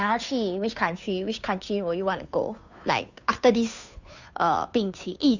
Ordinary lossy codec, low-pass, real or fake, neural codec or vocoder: none; 7.2 kHz; fake; codec, 16 kHz, 2 kbps, FunCodec, trained on Chinese and English, 25 frames a second